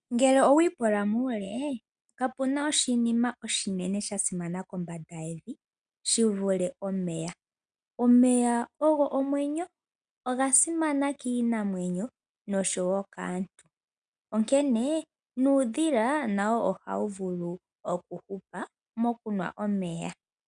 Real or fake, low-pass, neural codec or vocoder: real; 9.9 kHz; none